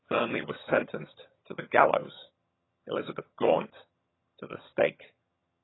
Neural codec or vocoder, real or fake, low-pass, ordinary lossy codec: vocoder, 22.05 kHz, 80 mel bands, HiFi-GAN; fake; 7.2 kHz; AAC, 16 kbps